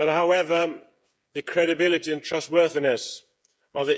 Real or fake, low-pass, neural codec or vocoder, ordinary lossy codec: fake; none; codec, 16 kHz, 8 kbps, FreqCodec, smaller model; none